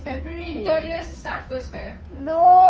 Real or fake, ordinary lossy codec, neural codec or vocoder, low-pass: fake; none; codec, 16 kHz, 2 kbps, FunCodec, trained on Chinese and English, 25 frames a second; none